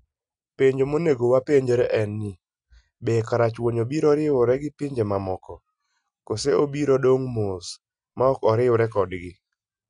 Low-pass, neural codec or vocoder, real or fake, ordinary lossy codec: 9.9 kHz; none; real; none